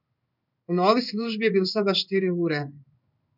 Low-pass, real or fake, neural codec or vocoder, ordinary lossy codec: 5.4 kHz; fake; codec, 16 kHz in and 24 kHz out, 1 kbps, XY-Tokenizer; none